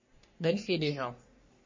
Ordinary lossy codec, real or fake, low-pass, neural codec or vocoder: MP3, 32 kbps; fake; 7.2 kHz; codec, 44.1 kHz, 3.4 kbps, Pupu-Codec